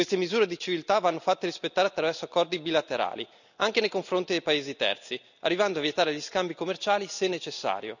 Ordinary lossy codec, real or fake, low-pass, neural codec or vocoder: none; real; 7.2 kHz; none